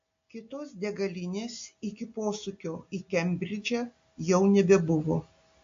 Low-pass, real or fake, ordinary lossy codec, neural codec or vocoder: 7.2 kHz; real; AAC, 48 kbps; none